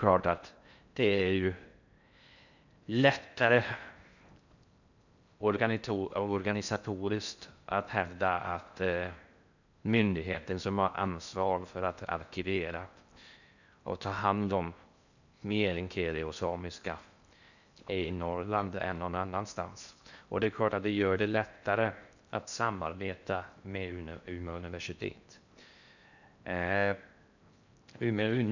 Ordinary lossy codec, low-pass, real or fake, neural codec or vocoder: none; 7.2 kHz; fake; codec, 16 kHz in and 24 kHz out, 0.6 kbps, FocalCodec, streaming, 4096 codes